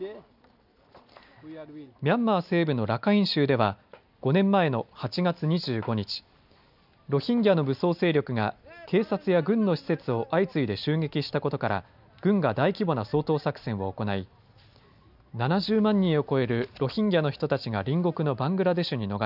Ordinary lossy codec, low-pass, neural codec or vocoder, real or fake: none; 5.4 kHz; none; real